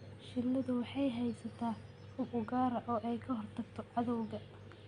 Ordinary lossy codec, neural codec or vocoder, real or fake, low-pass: Opus, 64 kbps; none; real; 9.9 kHz